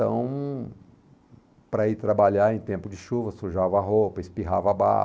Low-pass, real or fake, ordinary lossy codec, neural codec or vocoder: none; real; none; none